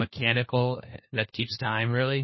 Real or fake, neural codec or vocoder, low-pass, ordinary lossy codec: fake; codec, 16 kHz, 1 kbps, FunCodec, trained on LibriTTS, 50 frames a second; 7.2 kHz; MP3, 24 kbps